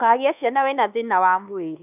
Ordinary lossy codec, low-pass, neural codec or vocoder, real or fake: none; 3.6 kHz; codec, 24 kHz, 1.2 kbps, DualCodec; fake